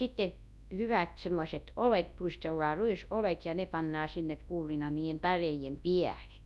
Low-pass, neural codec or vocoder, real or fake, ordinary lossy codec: none; codec, 24 kHz, 0.9 kbps, WavTokenizer, large speech release; fake; none